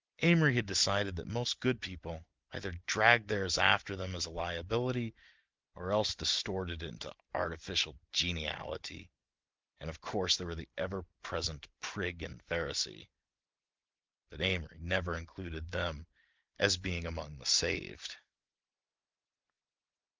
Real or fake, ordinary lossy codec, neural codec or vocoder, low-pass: real; Opus, 24 kbps; none; 7.2 kHz